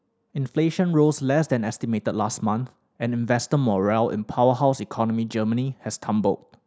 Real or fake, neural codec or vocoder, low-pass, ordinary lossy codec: real; none; none; none